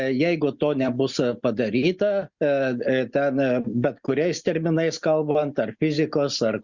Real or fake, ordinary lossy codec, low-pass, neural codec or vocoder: real; Opus, 64 kbps; 7.2 kHz; none